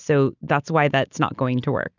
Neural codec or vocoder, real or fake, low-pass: none; real; 7.2 kHz